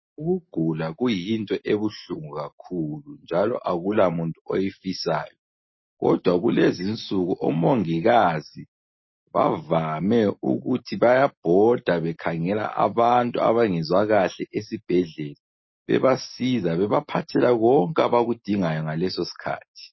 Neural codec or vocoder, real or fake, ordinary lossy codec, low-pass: none; real; MP3, 24 kbps; 7.2 kHz